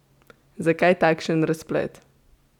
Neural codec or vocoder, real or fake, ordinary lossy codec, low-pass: none; real; none; 19.8 kHz